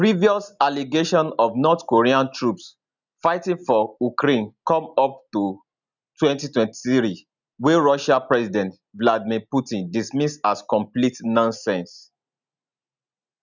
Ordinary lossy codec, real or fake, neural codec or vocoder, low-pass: none; real; none; 7.2 kHz